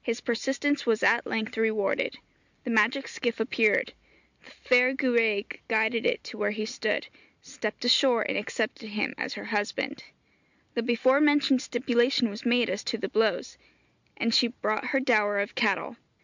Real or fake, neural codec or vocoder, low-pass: real; none; 7.2 kHz